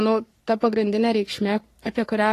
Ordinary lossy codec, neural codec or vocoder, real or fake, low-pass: AAC, 48 kbps; codec, 44.1 kHz, 3.4 kbps, Pupu-Codec; fake; 14.4 kHz